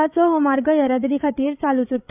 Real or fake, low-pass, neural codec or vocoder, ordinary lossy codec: fake; 3.6 kHz; codec, 16 kHz, 8 kbps, FreqCodec, larger model; none